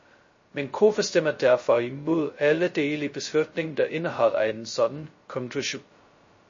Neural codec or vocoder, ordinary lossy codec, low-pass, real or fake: codec, 16 kHz, 0.2 kbps, FocalCodec; MP3, 32 kbps; 7.2 kHz; fake